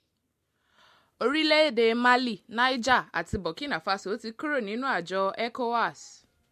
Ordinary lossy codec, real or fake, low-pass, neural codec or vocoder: MP3, 64 kbps; real; 14.4 kHz; none